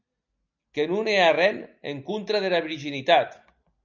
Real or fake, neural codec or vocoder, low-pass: real; none; 7.2 kHz